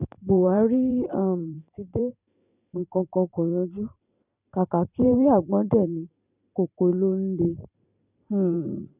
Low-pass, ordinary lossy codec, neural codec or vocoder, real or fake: 3.6 kHz; none; vocoder, 44.1 kHz, 128 mel bands every 512 samples, BigVGAN v2; fake